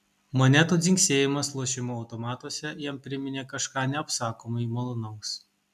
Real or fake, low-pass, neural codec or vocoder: real; 14.4 kHz; none